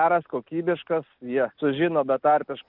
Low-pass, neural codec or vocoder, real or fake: 5.4 kHz; none; real